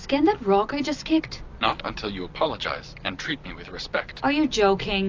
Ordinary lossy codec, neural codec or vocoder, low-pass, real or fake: AAC, 48 kbps; none; 7.2 kHz; real